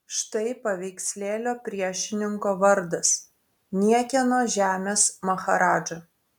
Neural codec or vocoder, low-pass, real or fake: none; 19.8 kHz; real